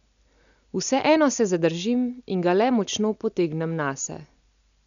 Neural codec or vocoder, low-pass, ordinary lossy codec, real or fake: none; 7.2 kHz; none; real